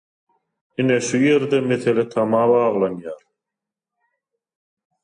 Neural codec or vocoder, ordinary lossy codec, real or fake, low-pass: none; AAC, 64 kbps; real; 9.9 kHz